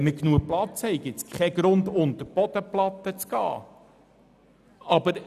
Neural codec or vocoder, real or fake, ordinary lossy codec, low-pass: none; real; none; 14.4 kHz